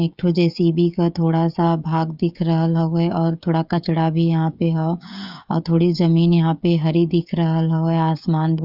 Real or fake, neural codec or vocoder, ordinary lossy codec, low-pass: fake; codec, 16 kHz, 16 kbps, FreqCodec, smaller model; none; 5.4 kHz